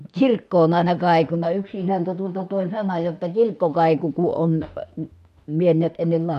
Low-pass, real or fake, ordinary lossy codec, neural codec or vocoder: 14.4 kHz; fake; MP3, 64 kbps; autoencoder, 48 kHz, 32 numbers a frame, DAC-VAE, trained on Japanese speech